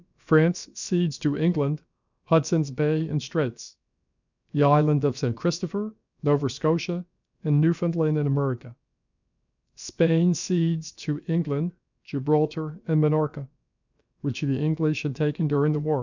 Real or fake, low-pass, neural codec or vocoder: fake; 7.2 kHz; codec, 16 kHz, about 1 kbps, DyCAST, with the encoder's durations